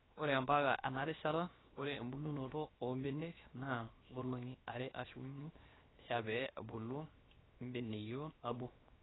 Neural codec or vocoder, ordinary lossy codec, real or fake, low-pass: codec, 16 kHz, 0.7 kbps, FocalCodec; AAC, 16 kbps; fake; 7.2 kHz